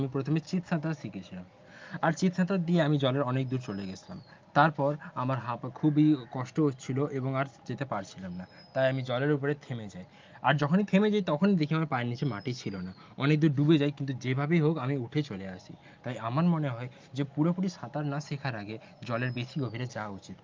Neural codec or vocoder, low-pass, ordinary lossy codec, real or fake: none; 7.2 kHz; Opus, 32 kbps; real